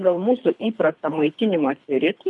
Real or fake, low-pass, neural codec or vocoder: fake; 10.8 kHz; codec, 24 kHz, 3 kbps, HILCodec